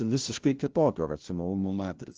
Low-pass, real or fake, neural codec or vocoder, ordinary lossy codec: 7.2 kHz; fake; codec, 16 kHz, 0.5 kbps, FunCodec, trained on LibriTTS, 25 frames a second; Opus, 16 kbps